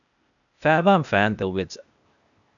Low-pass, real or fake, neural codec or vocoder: 7.2 kHz; fake; codec, 16 kHz, 0.8 kbps, ZipCodec